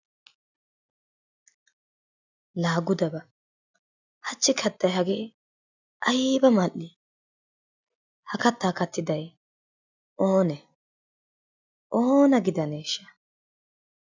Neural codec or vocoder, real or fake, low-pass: none; real; 7.2 kHz